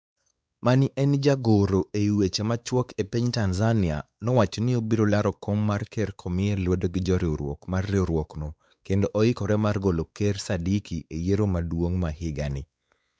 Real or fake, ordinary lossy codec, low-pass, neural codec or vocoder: fake; none; none; codec, 16 kHz, 4 kbps, X-Codec, WavLM features, trained on Multilingual LibriSpeech